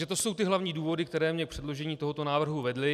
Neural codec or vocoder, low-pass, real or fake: none; 14.4 kHz; real